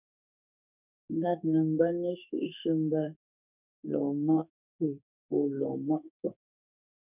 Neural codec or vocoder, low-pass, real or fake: codec, 32 kHz, 1.9 kbps, SNAC; 3.6 kHz; fake